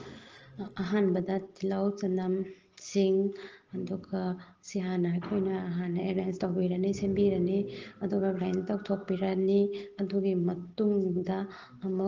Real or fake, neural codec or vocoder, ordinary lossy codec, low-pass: real; none; Opus, 16 kbps; 7.2 kHz